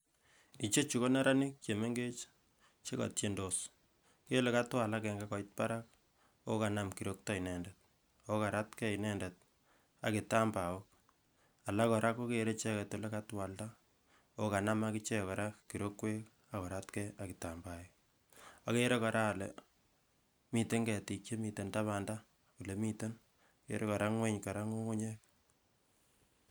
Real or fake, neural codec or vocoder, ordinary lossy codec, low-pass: real; none; none; none